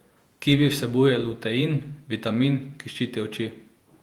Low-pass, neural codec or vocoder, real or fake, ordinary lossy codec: 19.8 kHz; vocoder, 48 kHz, 128 mel bands, Vocos; fake; Opus, 32 kbps